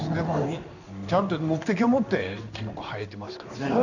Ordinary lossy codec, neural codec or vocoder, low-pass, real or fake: none; codec, 16 kHz in and 24 kHz out, 1 kbps, XY-Tokenizer; 7.2 kHz; fake